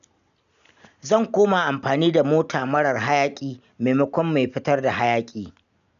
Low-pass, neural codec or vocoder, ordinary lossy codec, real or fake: 7.2 kHz; none; none; real